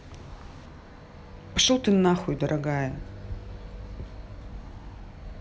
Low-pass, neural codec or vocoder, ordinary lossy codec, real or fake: none; none; none; real